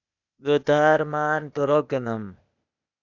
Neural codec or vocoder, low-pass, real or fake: codec, 16 kHz, 0.8 kbps, ZipCodec; 7.2 kHz; fake